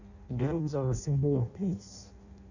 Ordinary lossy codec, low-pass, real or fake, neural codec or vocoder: none; 7.2 kHz; fake; codec, 16 kHz in and 24 kHz out, 0.6 kbps, FireRedTTS-2 codec